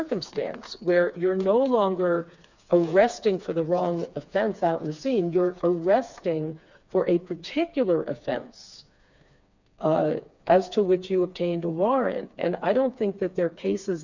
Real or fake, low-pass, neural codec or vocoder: fake; 7.2 kHz; codec, 16 kHz, 4 kbps, FreqCodec, smaller model